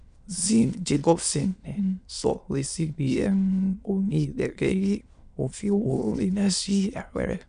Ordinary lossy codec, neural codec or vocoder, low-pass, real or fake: MP3, 96 kbps; autoencoder, 22.05 kHz, a latent of 192 numbers a frame, VITS, trained on many speakers; 9.9 kHz; fake